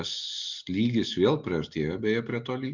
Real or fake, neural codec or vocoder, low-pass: real; none; 7.2 kHz